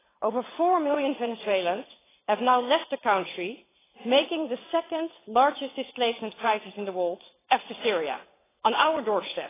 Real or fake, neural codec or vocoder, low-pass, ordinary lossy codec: fake; vocoder, 22.05 kHz, 80 mel bands, WaveNeXt; 3.6 kHz; AAC, 16 kbps